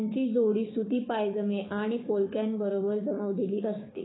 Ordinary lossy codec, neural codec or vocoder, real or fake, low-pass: AAC, 16 kbps; codec, 16 kHz, 6 kbps, DAC; fake; 7.2 kHz